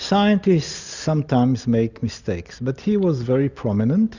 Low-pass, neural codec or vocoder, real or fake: 7.2 kHz; none; real